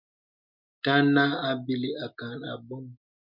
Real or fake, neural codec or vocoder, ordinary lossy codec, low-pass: real; none; MP3, 48 kbps; 5.4 kHz